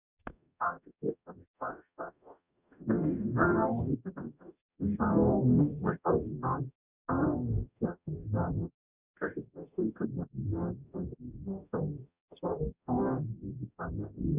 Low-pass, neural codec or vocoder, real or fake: 3.6 kHz; codec, 44.1 kHz, 0.9 kbps, DAC; fake